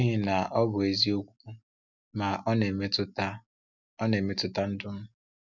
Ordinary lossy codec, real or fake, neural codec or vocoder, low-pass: none; real; none; 7.2 kHz